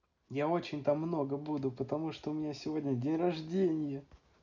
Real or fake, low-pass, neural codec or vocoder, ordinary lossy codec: real; 7.2 kHz; none; none